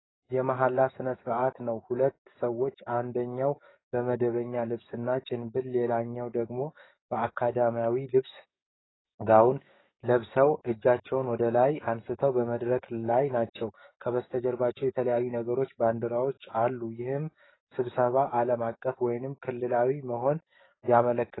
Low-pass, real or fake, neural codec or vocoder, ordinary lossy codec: 7.2 kHz; fake; codec, 44.1 kHz, 7.8 kbps, DAC; AAC, 16 kbps